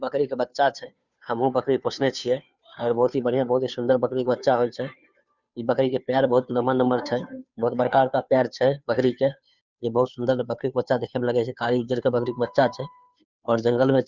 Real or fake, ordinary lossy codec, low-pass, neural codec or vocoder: fake; Opus, 64 kbps; 7.2 kHz; codec, 16 kHz, 2 kbps, FunCodec, trained on Chinese and English, 25 frames a second